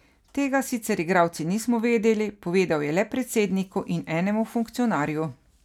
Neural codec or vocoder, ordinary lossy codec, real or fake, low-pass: none; none; real; 19.8 kHz